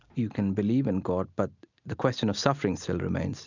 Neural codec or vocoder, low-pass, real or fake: none; 7.2 kHz; real